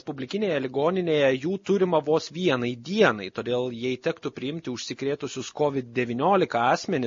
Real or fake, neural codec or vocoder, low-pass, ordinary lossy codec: real; none; 7.2 kHz; MP3, 32 kbps